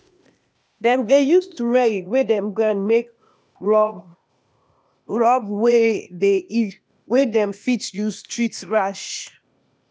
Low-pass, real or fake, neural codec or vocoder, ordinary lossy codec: none; fake; codec, 16 kHz, 0.8 kbps, ZipCodec; none